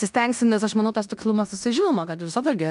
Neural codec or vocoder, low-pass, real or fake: codec, 16 kHz in and 24 kHz out, 0.9 kbps, LongCat-Audio-Codec, fine tuned four codebook decoder; 10.8 kHz; fake